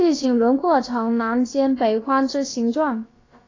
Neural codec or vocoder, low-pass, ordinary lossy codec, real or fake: codec, 16 kHz, about 1 kbps, DyCAST, with the encoder's durations; 7.2 kHz; AAC, 32 kbps; fake